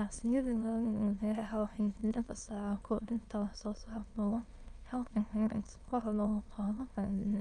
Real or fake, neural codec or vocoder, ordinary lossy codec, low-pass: fake; autoencoder, 22.05 kHz, a latent of 192 numbers a frame, VITS, trained on many speakers; Opus, 32 kbps; 9.9 kHz